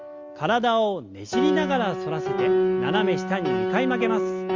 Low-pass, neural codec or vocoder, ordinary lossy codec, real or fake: 7.2 kHz; none; Opus, 32 kbps; real